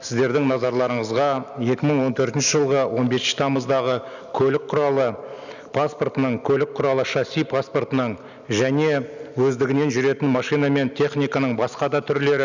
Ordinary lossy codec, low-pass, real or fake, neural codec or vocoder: none; 7.2 kHz; real; none